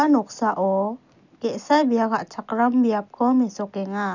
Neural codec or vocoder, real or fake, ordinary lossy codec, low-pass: none; real; none; 7.2 kHz